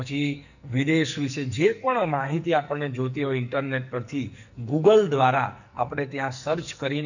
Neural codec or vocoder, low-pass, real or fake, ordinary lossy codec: codec, 44.1 kHz, 2.6 kbps, SNAC; 7.2 kHz; fake; none